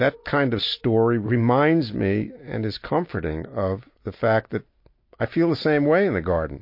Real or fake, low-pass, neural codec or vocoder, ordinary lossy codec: real; 5.4 kHz; none; MP3, 32 kbps